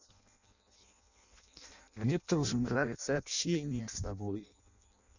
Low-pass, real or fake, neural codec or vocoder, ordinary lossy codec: 7.2 kHz; fake; codec, 16 kHz in and 24 kHz out, 0.6 kbps, FireRedTTS-2 codec; none